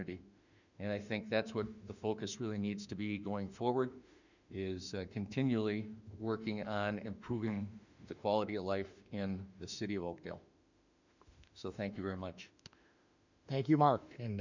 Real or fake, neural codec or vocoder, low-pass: fake; autoencoder, 48 kHz, 32 numbers a frame, DAC-VAE, trained on Japanese speech; 7.2 kHz